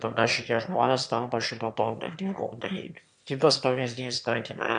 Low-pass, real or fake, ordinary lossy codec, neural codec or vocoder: 9.9 kHz; fake; MP3, 64 kbps; autoencoder, 22.05 kHz, a latent of 192 numbers a frame, VITS, trained on one speaker